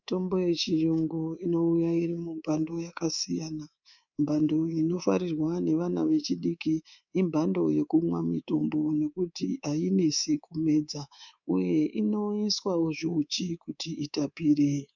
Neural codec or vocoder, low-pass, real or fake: codec, 24 kHz, 3.1 kbps, DualCodec; 7.2 kHz; fake